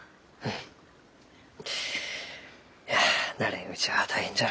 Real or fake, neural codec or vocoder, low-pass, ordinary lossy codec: real; none; none; none